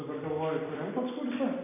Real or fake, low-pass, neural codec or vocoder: real; 3.6 kHz; none